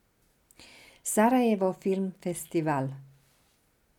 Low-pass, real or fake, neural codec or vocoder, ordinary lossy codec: 19.8 kHz; real; none; none